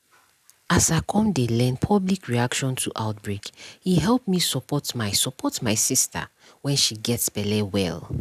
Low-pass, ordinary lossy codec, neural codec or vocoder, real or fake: 14.4 kHz; none; none; real